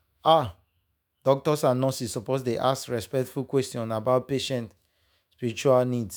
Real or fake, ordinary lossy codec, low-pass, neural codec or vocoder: fake; none; none; autoencoder, 48 kHz, 128 numbers a frame, DAC-VAE, trained on Japanese speech